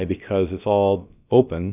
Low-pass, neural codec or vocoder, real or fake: 3.6 kHz; codec, 16 kHz, about 1 kbps, DyCAST, with the encoder's durations; fake